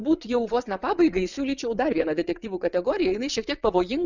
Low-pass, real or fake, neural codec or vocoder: 7.2 kHz; fake; vocoder, 22.05 kHz, 80 mel bands, WaveNeXt